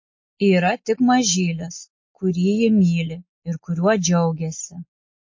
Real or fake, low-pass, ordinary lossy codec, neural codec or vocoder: real; 7.2 kHz; MP3, 32 kbps; none